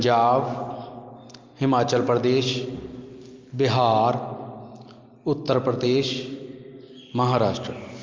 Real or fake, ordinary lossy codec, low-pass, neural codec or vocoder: real; Opus, 24 kbps; 7.2 kHz; none